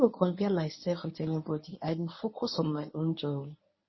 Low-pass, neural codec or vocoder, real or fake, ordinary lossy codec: 7.2 kHz; codec, 24 kHz, 0.9 kbps, WavTokenizer, medium speech release version 1; fake; MP3, 24 kbps